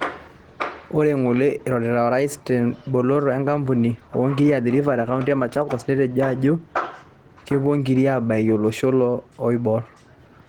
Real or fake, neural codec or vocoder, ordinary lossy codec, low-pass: real; none; Opus, 16 kbps; 19.8 kHz